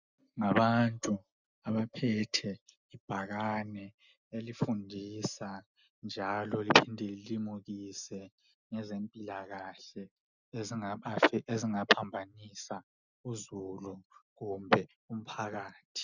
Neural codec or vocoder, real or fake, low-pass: none; real; 7.2 kHz